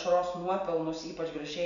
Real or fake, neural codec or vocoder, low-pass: real; none; 7.2 kHz